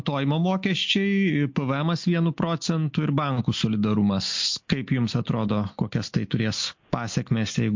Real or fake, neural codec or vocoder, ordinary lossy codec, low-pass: real; none; MP3, 48 kbps; 7.2 kHz